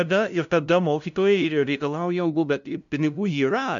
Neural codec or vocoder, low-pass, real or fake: codec, 16 kHz, 0.5 kbps, FunCodec, trained on LibriTTS, 25 frames a second; 7.2 kHz; fake